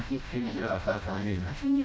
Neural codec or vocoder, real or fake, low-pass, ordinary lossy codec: codec, 16 kHz, 0.5 kbps, FreqCodec, smaller model; fake; none; none